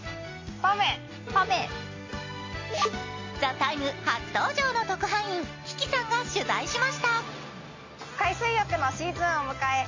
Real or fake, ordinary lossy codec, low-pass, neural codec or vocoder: real; MP3, 32 kbps; 7.2 kHz; none